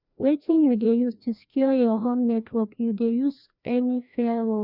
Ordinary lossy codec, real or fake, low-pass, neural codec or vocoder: none; fake; 5.4 kHz; codec, 16 kHz, 1 kbps, FreqCodec, larger model